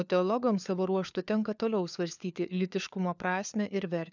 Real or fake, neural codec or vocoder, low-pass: fake; codec, 16 kHz, 4 kbps, FunCodec, trained on LibriTTS, 50 frames a second; 7.2 kHz